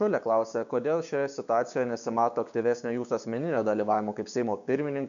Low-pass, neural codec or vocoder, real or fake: 7.2 kHz; codec, 16 kHz, 6 kbps, DAC; fake